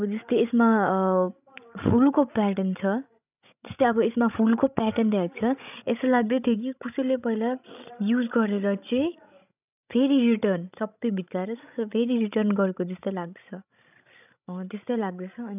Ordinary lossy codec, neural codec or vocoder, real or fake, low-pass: none; codec, 16 kHz, 16 kbps, FreqCodec, larger model; fake; 3.6 kHz